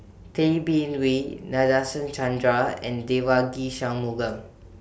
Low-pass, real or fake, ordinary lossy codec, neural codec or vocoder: none; real; none; none